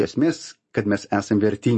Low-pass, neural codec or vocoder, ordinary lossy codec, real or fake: 10.8 kHz; none; MP3, 32 kbps; real